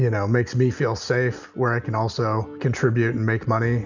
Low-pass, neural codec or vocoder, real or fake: 7.2 kHz; none; real